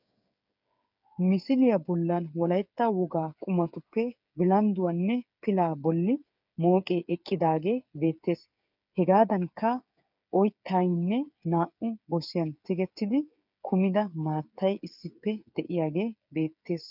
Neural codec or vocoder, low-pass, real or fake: codec, 16 kHz, 8 kbps, FreqCodec, smaller model; 5.4 kHz; fake